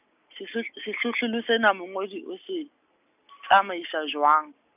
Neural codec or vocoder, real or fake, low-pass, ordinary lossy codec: none; real; 3.6 kHz; none